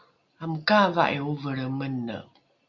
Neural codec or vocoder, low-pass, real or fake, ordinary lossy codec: none; 7.2 kHz; real; Opus, 64 kbps